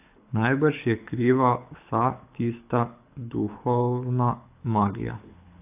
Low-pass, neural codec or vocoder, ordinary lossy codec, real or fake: 3.6 kHz; codec, 24 kHz, 6 kbps, HILCodec; AAC, 32 kbps; fake